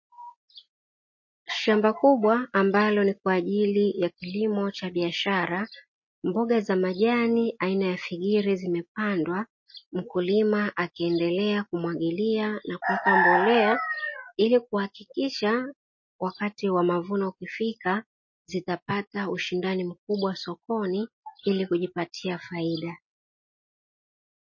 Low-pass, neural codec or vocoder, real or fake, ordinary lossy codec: 7.2 kHz; none; real; MP3, 32 kbps